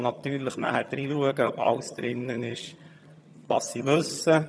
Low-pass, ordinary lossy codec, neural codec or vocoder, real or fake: none; none; vocoder, 22.05 kHz, 80 mel bands, HiFi-GAN; fake